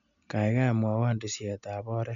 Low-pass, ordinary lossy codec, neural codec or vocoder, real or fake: 7.2 kHz; none; none; real